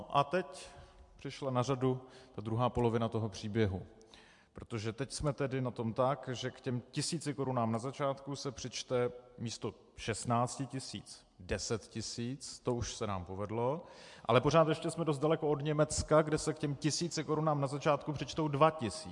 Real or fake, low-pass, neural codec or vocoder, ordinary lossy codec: real; 10.8 kHz; none; MP3, 64 kbps